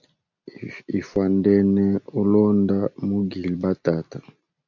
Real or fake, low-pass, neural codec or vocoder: real; 7.2 kHz; none